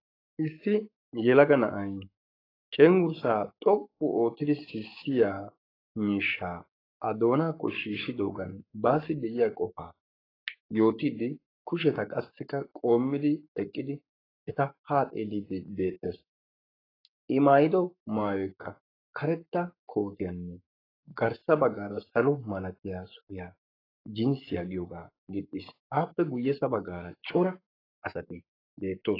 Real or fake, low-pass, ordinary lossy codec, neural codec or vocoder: fake; 5.4 kHz; AAC, 24 kbps; codec, 44.1 kHz, 7.8 kbps, Pupu-Codec